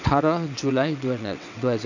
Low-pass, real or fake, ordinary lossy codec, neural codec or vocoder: 7.2 kHz; fake; none; vocoder, 22.05 kHz, 80 mel bands, WaveNeXt